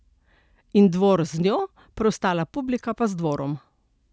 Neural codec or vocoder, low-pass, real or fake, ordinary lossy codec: none; none; real; none